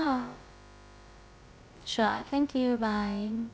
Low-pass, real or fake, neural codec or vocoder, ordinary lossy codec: none; fake; codec, 16 kHz, about 1 kbps, DyCAST, with the encoder's durations; none